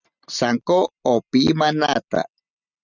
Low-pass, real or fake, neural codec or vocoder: 7.2 kHz; real; none